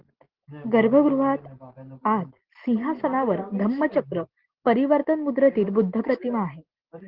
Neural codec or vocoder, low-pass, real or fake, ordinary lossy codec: none; 5.4 kHz; real; Opus, 16 kbps